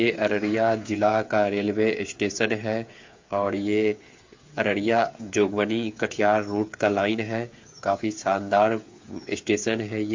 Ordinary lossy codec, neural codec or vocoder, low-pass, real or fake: MP3, 48 kbps; codec, 16 kHz, 8 kbps, FreqCodec, smaller model; 7.2 kHz; fake